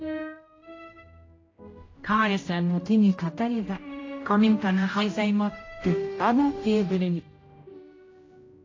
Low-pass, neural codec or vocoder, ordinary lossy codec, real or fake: 7.2 kHz; codec, 16 kHz, 0.5 kbps, X-Codec, HuBERT features, trained on general audio; AAC, 32 kbps; fake